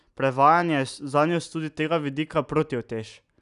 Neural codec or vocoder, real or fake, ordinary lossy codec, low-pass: none; real; none; 10.8 kHz